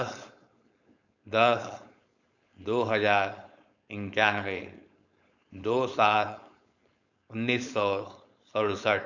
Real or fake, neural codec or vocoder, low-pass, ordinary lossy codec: fake; codec, 16 kHz, 4.8 kbps, FACodec; 7.2 kHz; none